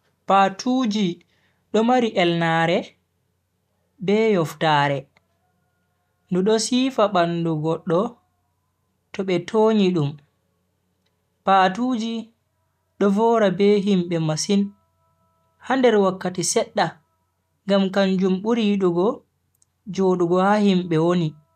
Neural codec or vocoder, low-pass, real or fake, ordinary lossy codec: none; 14.4 kHz; real; none